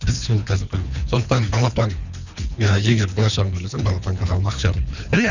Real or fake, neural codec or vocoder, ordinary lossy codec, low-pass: fake; codec, 24 kHz, 3 kbps, HILCodec; none; 7.2 kHz